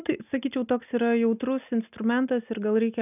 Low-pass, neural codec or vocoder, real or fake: 3.6 kHz; none; real